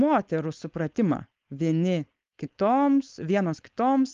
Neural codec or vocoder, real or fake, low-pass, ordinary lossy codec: codec, 16 kHz, 4.8 kbps, FACodec; fake; 7.2 kHz; Opus, 32 kbps